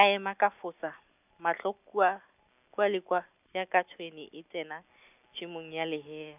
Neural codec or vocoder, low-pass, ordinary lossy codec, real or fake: none; 3.6 kHz; none; real